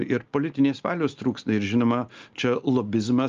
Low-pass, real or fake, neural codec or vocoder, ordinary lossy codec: 7.2 kHz; real; none; Opus, 32 kbps